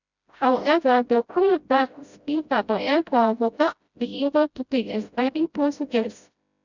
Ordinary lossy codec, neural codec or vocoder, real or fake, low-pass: none; codec, 16 kHz, 0.5 kbps, FreqCodec, smaller model; fake; 7.2 kHz